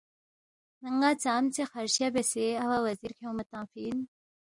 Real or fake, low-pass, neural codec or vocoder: real; 10.8 kHz; none